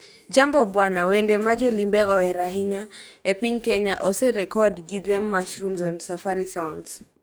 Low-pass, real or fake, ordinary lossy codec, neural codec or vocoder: none; fake; none; codec, 44.1 kHz, 2.6 kbps, DAC